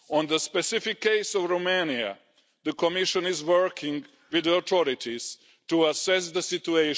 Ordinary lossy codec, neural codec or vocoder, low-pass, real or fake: none; none; none; real